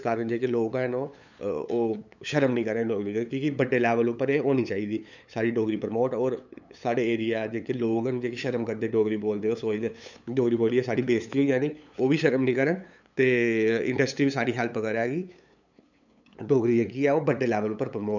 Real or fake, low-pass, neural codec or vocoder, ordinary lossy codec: fake; 7.2 kHz; codec, 16 kHz, 8 kbps, FunCodec, trained on LibriTTS, 25 frames a second; none